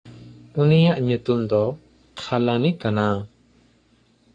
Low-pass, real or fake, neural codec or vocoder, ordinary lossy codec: 9.9 kHz; fake; codec, 44.1 kHz, 3.4 kbps, Pupu-Codec; AAC, 48 kbps